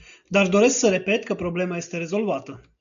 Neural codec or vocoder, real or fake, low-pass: none; real; 7.2 kHz